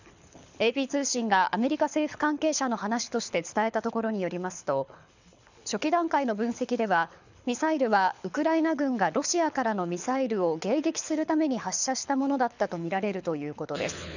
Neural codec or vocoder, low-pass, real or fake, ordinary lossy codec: codec, 24 kHz, 6 kbps, HILCodec; 7.2 kHz; fake; none